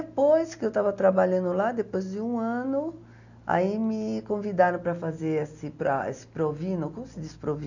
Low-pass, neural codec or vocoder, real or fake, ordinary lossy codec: 7.2 kHz; none; real; none